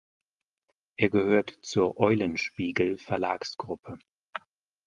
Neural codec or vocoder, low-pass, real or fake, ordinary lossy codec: none; 10.8 kHz; real; Opus, 32 kbps